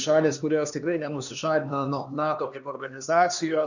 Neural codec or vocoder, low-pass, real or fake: codec, 16 kHz, 2 kbps, X-Codec, HuBERT features, trained on LibriSpeech; 7.2 kHz; fake